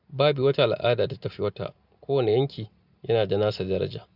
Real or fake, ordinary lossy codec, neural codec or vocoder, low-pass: real; none; none; 5.4 kHz